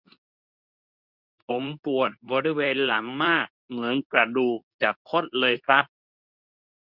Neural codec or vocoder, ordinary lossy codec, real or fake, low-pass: codec, 24 kHz, 0.9 kbps, WavTokenizer, medium speech release version 2; none; fake; 5.4 kHz